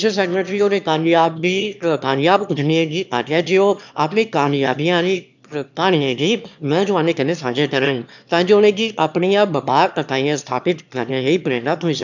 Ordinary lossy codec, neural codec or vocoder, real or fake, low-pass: none; autoencoder, 22.05 kHz, a latent of 192 numbers a frame, VITS, trained on one speaker; fake; 7.2 kHz